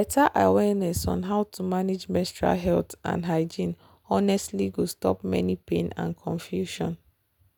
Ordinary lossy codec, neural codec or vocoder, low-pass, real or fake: none; none; none; real